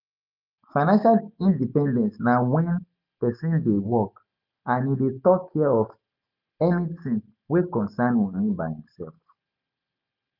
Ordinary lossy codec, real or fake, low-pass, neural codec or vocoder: none; real; 5.4 kHz; none